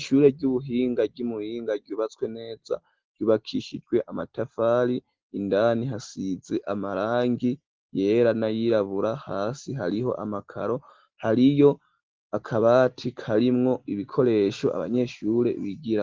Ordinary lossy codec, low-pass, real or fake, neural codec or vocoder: Opus, 16 kbps; 7.2 kHz; real; none